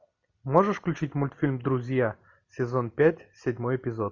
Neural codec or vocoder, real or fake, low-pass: none; real; 7.2 kHz